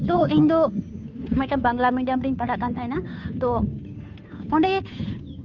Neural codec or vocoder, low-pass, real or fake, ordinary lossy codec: codec, 16 kHz, 2 kbps, FunCodec, trained on Chinese and English, 25 frames a second; 7.2 kHz; fake; none